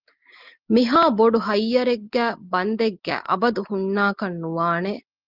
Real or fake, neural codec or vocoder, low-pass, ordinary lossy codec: real; none; 5.4 kHz; Opus, 32 kbps